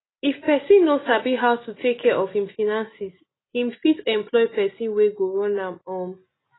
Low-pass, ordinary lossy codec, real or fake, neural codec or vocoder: 7.2 kHz; AAC, 16 kbps; real; none